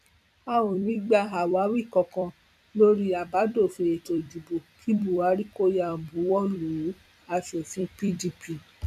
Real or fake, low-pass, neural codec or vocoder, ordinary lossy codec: fake; 14.4 kHz; vocoder, 44.1 kHz, 128 mel bands every 256 samples, BigVGAN v2; none